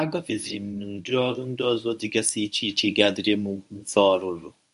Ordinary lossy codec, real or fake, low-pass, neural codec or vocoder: none; fake; 10.8 kHz; codec, 24 kHz, 0.9 kbps, WavTokenizer, medium speech release version 1